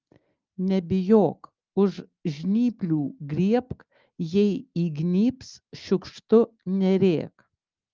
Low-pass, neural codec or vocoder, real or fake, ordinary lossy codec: 7.2 kHz; none; real; Opus, 32 kbps